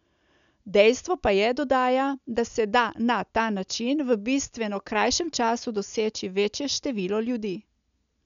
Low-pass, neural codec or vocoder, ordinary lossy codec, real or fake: 7.2 kHz; none; none; real